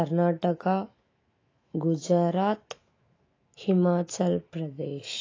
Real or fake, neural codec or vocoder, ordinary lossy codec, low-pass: fake; autoencoder, 48 kHz, 128 numbers a frame, DAC-VAE, trained on Japanese speech; AAC, 32 kbps; 7.2 kHz